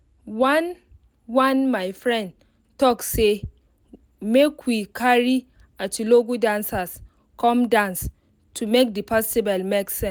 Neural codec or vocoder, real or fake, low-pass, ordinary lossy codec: none; real; none; none